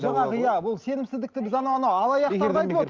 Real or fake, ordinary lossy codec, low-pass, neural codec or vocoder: real; Opus, 32 kbps; 7.2 kHz; none